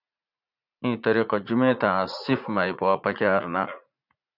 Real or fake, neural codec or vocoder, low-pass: fake; vocoder, 44.1 kHz, 80 mel bands, Vocos; 5.4 kHz